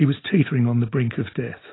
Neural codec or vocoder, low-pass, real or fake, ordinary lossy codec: none; 7.2 kHz; real; AAC, 16 kbps